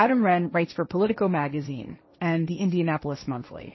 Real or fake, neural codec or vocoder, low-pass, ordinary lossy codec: fake; codec, 16 kHz, 1.1 kbps, Voila-Tokenizer; 7.2 kHz; MP3, 24 kbps